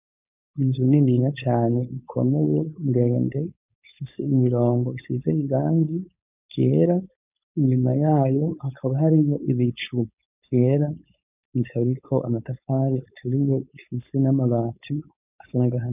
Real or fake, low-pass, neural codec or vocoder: fake; 3.6 kHz; codec, 16 kHz, 4.8 kbps, FACodec